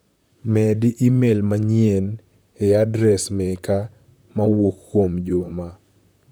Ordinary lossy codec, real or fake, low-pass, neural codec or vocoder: none; fake; none; vocoder, 44.1 kHz, 128 mel bands, Pupu-Vocoder